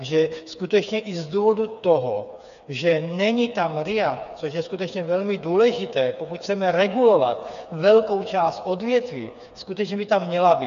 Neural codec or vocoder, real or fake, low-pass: codec, 16 kHz, 4 kbps, FreqCodec, smaller model; fake; 7.2 kHz